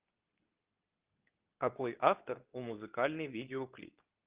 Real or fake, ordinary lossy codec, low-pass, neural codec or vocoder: fake; Opus, 24 kbps; 3.6 kHz; codec, 24 kHz, 0.9 kbps, WavTokenizer, medium speech release version 2